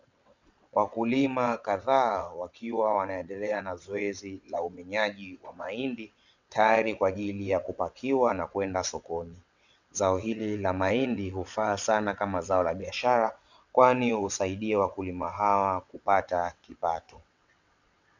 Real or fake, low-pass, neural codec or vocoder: fake; 7.2 kHz; vocoder, 22.05 kHz, 80 mel bands, WaveNeXt